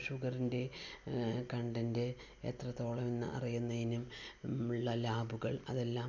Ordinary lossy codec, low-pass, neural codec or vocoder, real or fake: none; 7.2 kHz; none; real